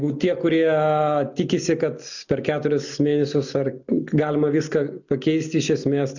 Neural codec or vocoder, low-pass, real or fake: none; 7.2 kHz; real